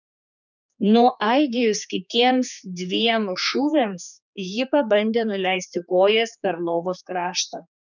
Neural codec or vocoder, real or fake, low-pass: codec, 16 kHz, 4 kbps, X-Codec, HuBERT features, trained on general audio; fake; 7.2 kHz